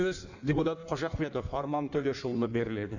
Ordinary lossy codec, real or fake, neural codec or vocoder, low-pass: none; fake; codec, 16 kHz in and 24 kHz out, 1.1 kbps, FireRedTTS-2 codec; 7.2 kHz